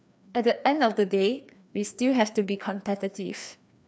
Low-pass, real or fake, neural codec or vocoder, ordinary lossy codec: none; fake; codec, 16 kHz, 2 kbps, FreqCodec, larger model; none